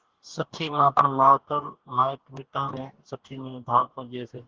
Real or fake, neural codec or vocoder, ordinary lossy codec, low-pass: fake; codec, 44.1 kHz, 2.6 kbps, DAC; Opus, 16 kbps; 7.2 kHz